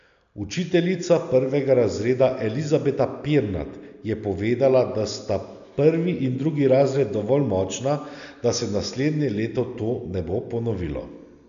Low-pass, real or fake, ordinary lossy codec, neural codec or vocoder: 7.2 kHz; real; none; none